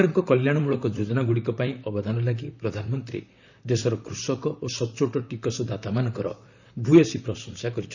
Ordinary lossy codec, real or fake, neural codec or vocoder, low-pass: none; fake; vocoder, 44.1 kHz, 128 mel bands, Pupu-Vocoder; 7.2 kHz